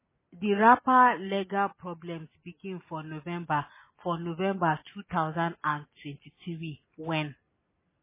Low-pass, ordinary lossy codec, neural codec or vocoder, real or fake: 3.6 kHz; MP3, 16 kbps; none; real